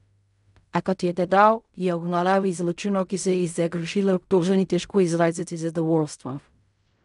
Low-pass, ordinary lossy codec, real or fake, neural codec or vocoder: 10.8 kHz; none; fake; codec, 16 kHz in and 24 kHz out, 0.4 kbps, LongCat-Audio-Codec, fine tuned four codebook decoder